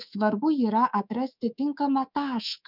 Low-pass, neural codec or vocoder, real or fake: 5.4 kHz; codec, 24 kHz, 3.1 kbps, DualCodec; fake